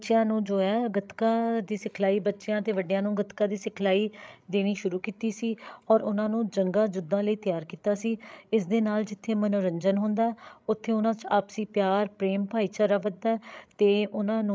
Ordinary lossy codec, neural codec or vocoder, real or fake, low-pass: none; codec, 16 kHz, 16 kbps, FreqCodec, larger model; fake; none